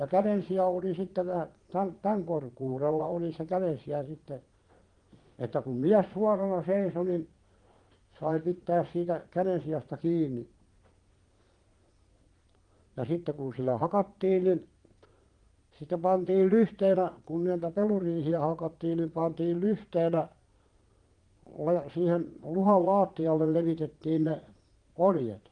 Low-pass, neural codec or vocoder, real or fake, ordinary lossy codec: 9.9 kHz; vocoder, 22.05 kHz, 80 mel bands, Vocos; fake; Opus, 24 kbps